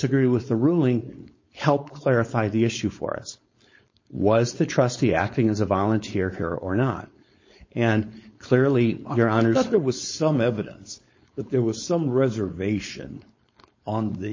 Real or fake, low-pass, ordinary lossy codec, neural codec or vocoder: fake; 7.2 kHz; MP3, 32 kbps; codec, 16 kHz, 4.8 kbps, FACodec